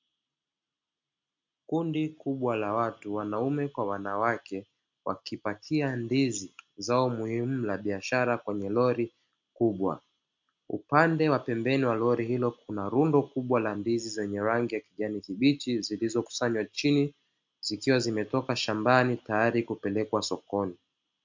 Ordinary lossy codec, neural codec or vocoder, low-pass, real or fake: MP3, 64 kbps; none; 7.2 kHz; real